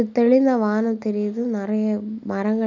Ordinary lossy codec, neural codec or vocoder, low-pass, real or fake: none; none; 7.2 kHz; real